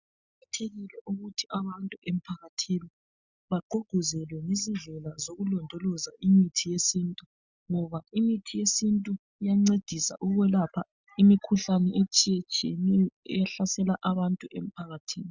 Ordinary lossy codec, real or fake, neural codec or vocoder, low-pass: Opus, 64 kbps; real; none; 7.2 kHz